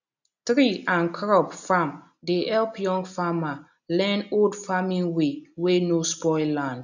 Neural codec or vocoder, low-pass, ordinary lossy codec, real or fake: none; 7.2 kHz; none; real